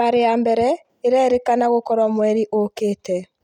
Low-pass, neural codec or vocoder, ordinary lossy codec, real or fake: 19.8 kHz; none; none; real